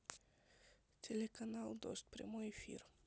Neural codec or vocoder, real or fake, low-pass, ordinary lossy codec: none; real; none; none